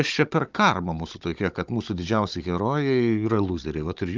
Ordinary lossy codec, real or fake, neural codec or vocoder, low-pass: Opus, 24 kbps; fake; codec, 16 kHz, 16 kbps, FunCodec, trained on Chinese and English, 50 frames a second; 7.2 kHz